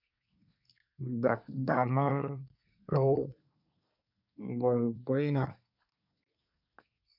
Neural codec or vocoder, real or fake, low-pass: codec, 24 kHz, 1 kbps, SNAC; fake; 5.4 kHz